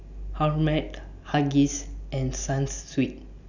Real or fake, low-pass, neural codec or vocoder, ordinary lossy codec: real; 7.2 kHz; none; none